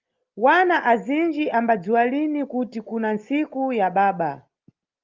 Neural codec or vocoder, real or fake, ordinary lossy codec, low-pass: none; real; Opus, 24 kbps; 7.2 kHz